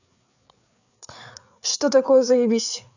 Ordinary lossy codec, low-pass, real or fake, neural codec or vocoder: none; 7.2 kHz; fake; codec, 16 kHz, 4 kbps, FreqCodec, larger model